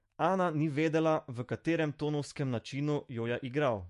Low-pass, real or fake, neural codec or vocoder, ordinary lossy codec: 14.4 kHz; fake; autoencoder, 48 kHz, 128 numbers a frame, DAC-VAE, trained on Japanese speech; MP3, 48 kbps